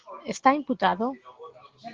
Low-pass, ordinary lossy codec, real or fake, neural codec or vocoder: 7.2 kHz; Opus, 16 kbps; real; none